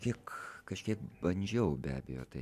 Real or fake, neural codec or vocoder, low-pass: real; none; 14.4 kHz